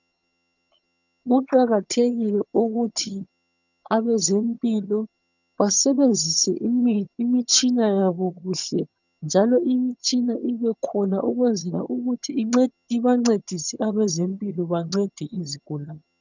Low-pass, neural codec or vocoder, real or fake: 7.2 kHz; vocoder, 22.05 kHz, 80 mel bands, HiFi-GAN; fake